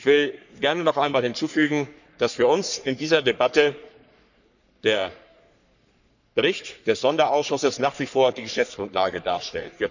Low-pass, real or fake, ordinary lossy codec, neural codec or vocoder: 7.2 kHz; fake; none; codec, 44.1 kHz, 3.4 kbps, Pupu-Codec